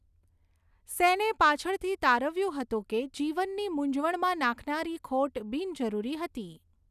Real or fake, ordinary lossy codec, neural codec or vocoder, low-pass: real; none; none; 14.4 kHz